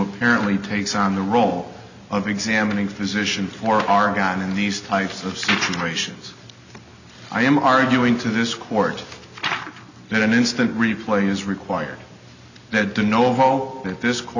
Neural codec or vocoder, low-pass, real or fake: none; 7.2 kHz; real